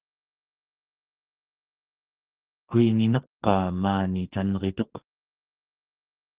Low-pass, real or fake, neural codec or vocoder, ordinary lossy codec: 3.6 kHz; fake; codec, 44.1 kHz, 2.6 kbps, SNAC; Opus, 16 kbps